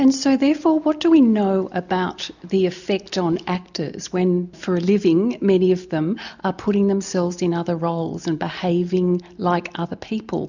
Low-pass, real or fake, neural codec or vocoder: 7.2 kHz; real; none